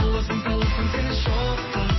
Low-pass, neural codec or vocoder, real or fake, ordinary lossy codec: 7.2 kHz; none; real; MP3, 24 kbps